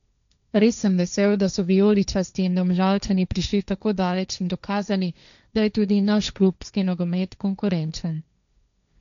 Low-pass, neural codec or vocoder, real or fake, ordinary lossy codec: 7.2 kHz; codec, 16 kHz, 1.1 kbps, Voila-Tokenizer; fake; none